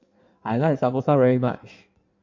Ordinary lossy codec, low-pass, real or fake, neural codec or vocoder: MP3, 48 kbps; 7.2 kHz; fake; codec, 16 kHz in and 24 kHz out, 1.1 kbps, FireRedTTS-2 codec